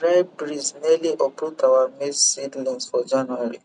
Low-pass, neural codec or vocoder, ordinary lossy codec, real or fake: 9.9 kHz; none; none; real